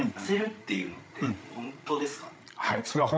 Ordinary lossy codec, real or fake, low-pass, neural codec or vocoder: none; fake; none; codec, 16 kHz, 16 kbps, FreqCodec, larger model